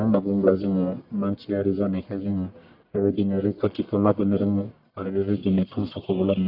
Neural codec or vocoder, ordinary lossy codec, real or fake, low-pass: codec, 44.1 kHz, 1.7 kbps, Pupu-Codec; AAC, 48 kbps; fake; 5.4 kHz